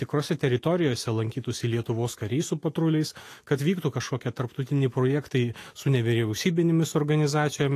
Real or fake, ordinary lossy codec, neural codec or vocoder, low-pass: fake; AAC, 48 kbps; autoencoder, 48 kHz, 128 numbers a frame, DAC-VAE, trained on Japanese speech; 14.4 kHz